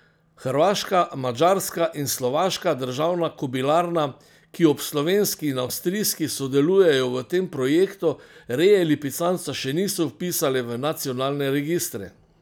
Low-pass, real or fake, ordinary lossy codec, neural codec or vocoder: none; real; none; none